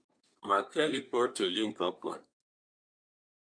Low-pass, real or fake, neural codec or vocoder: 9.9 kHz; fake; codec, 24 kHz, 1 kbps, SNAC